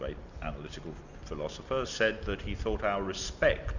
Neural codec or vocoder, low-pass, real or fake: none; 7.2 kHz; real